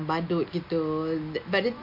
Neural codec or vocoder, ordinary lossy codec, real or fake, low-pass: none; MP3, 32 kbps; real; 5.4 kHz